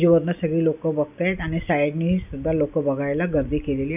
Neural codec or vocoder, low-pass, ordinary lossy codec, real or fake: none; 3.6 kHz; none; real